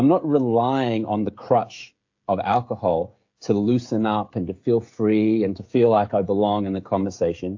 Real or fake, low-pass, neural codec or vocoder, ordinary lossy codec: fake; 7.2 kHz; codec, 16 kHz, 16 kbps, FreqCodec, smaller model; AAC, 48 kbps